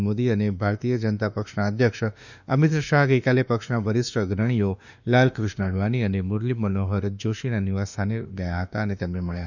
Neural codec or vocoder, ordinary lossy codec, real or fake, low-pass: autoencoder, 48 kHz, 32 numbers a frame, DAC-VAE, trained on Japanese speech; none; fake; 7.2 kHz